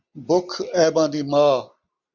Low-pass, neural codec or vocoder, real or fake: 7.2 kHz; none; real